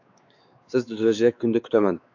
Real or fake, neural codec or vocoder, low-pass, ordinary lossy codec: fake; codec, 16 kHz, 4 kbps, X-Codec, WavLM features, trained on Multilingual LibriSpeech; 7.2 kHz; AAC, 48 kbps